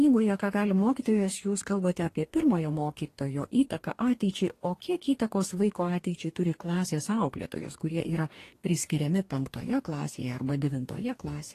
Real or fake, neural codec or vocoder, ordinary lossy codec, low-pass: fake; codec, 44.1 kHz, 2.6 kbps, DAC; AAC, 48 kbps; 14.4 kHz